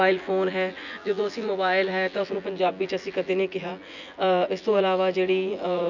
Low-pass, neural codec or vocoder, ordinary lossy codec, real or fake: 7.2 kHz; vocoder, 24 kHz, 100 mel bands, Vocos; none; fake